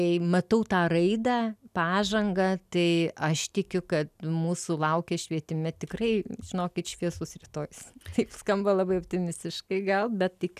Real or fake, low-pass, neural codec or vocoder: real; 14.4 kHz; none